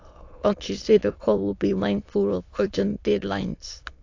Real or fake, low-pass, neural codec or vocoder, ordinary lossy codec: fake; 7.2 kHz; autoencoder, 22.05 kHz, a latent of 192 numbers a frame, VITS, trained on many speakers; AAC, 48 kbps